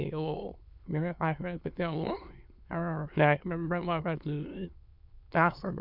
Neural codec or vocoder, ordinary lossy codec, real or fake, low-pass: autoencoder, 22.05 kHz, a latent of 192 numbers a frame, VITS, trained on many speakers; none; fake; 5.4 kHz